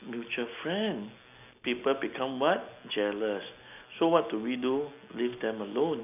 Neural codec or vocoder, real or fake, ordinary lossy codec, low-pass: none; real; none; 3.6 kHz